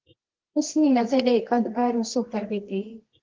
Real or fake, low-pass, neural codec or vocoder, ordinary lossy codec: fake; 7.2 kHz; codec, 24 kHz, 0.9 kbps, WavTokenizer, medium music audio release; Opus, 16 kbps